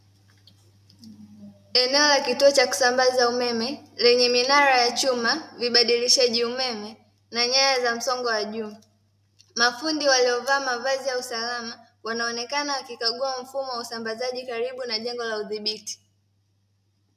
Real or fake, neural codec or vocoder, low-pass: real; none; 14.4 kHz